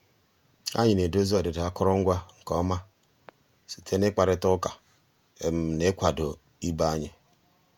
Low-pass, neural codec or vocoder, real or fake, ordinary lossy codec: 19.8 kHz; vocoder, 48 kHz, 128 mel bands, Vocos; fake; none